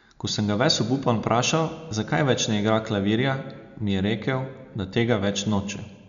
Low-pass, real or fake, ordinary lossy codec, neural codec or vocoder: 7.2 kHz; real; MP3, 96 kbps; none